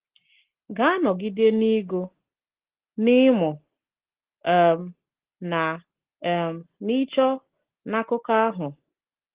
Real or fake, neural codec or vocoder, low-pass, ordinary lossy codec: real; none; 3.6 kHz; Opus, 16 kbps